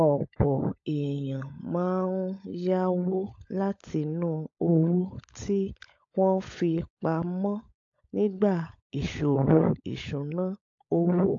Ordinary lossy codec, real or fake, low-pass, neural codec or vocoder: AAC, 48 kbps; fake; 7.2 kHz; codec, 16 kHz, 16 kbps, FunCodec, trained on LibriTTS, 50 frames a second